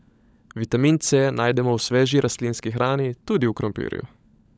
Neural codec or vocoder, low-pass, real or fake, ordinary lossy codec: codec, 16 kHz, 16 kbps, FunCodec, trained on LibriTTS, 50 frames a second; none; fake; none